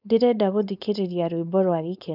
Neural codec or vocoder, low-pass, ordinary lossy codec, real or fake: codec, 16 kHz, 4.8 kbps, FACodec; 5.4 kHz; none; fake